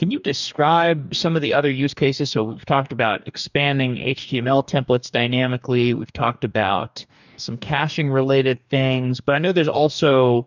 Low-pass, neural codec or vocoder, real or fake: 7.2 kHz; codec, 44.1 kHz, 2.6 kbps, DAC; fake